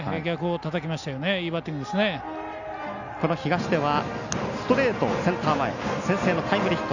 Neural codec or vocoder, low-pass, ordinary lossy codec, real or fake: none; 7.2 kHz; Opus, 64 kbps; real